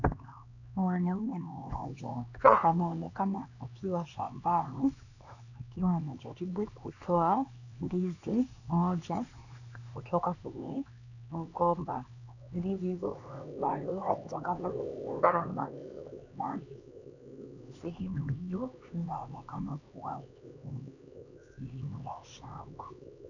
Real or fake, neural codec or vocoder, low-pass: fake; codec, 16 kHz, 2 kbps, X-Codec, HuBERT features, trained on LibriSpeech; 7.2 kHz